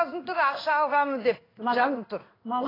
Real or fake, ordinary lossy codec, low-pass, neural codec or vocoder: fake; AAC, 24 kbps; 5.4 kHz; codec, 16 kHz in and 24 kHz out, 1 kbps, XY-Tokenizer